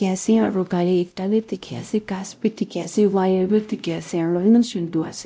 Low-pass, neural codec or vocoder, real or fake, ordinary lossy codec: none; codec, 16 kHz, 0.5 kbps, X-Codec, WavLM features, trained on Multilingual LibriSpeech; fake; none